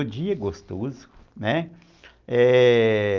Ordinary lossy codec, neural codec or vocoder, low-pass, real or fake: Opus, 24 kbps; none; 7.2 kHz; real